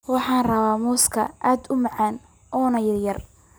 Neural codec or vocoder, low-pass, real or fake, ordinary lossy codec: none; none; real; none